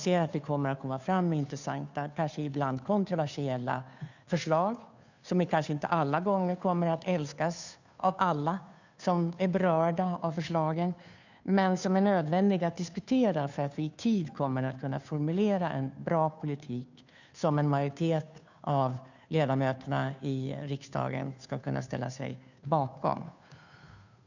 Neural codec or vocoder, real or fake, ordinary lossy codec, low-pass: codec, 16 kHz, 2 kbps, FunCodec, trained on Chinese and English, 25 frames a second; fake; none; 7.2 kHz